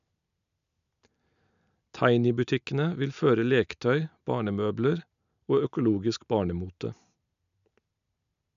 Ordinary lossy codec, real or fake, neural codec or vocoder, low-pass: none; real; none; 7.2 kHz